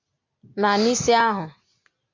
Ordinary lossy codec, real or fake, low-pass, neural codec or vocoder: MP3, 48 kbps; real; 7.2 kHz; none